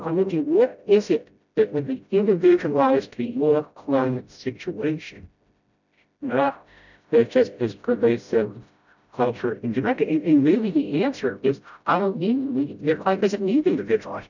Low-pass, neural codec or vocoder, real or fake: 7.2 kHz; codec, 16 kHz, 0.5 kbps, FreqCodec, smaller model; fake